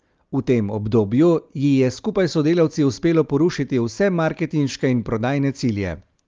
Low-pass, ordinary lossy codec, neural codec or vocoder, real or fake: 7.2 kHz; Opus, 32 kbps; none; real